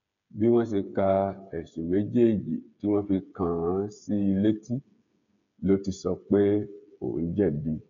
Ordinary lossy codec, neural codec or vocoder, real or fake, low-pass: none; codec, 16 kHz, 8 kbps, FreqCodec, smaller model; fake; 7.2 kHz